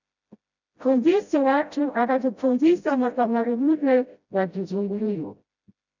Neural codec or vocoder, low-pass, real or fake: codec, 16 kHz, 0.5 kbps, FreqCodec, smaller model; 7.2 kHz; fake